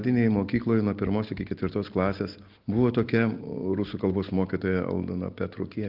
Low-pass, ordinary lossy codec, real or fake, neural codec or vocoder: 5.4 kHz; Opus, 32 kbps; real; none